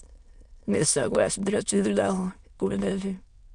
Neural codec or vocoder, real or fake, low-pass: autoencoder, 22.05 kHz, a latent of 192 numbers a frame, VITS, trained on many speakers; fake; 9.9 kHz